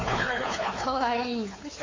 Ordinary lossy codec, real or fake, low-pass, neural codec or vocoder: AAC, 32 kbps; fake; 7.2 kHz; codec, 16 kHz, 4 kbps, X-Codec, WavLM features, trained on Multilingual LibriSpeech